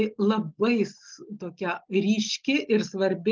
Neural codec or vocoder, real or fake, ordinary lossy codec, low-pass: none; real; Opus, 24 kbps; 7.2 kHz